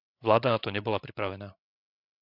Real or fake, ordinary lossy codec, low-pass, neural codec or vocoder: fake; MP3, 48 kbps; 5.4 kHz; codec, 16 kHz, 16 kbps, FreqCodec, larger model